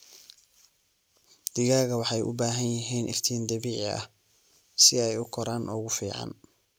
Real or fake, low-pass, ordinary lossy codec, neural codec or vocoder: real; none; none; none